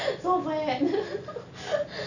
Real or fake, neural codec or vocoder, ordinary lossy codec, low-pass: real; none; none; 7.2 kHz